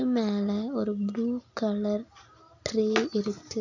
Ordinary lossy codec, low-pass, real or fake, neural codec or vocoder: none; 7.2 kHz; real; none